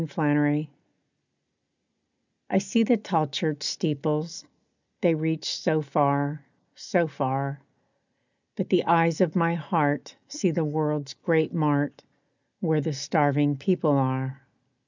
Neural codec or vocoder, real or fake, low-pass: none; real; 7.2 kHz